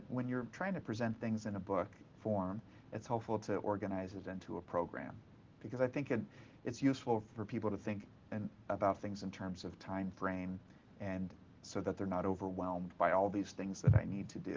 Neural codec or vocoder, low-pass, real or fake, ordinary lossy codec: none; 7.2 kHz; real; Opus, 16 kbps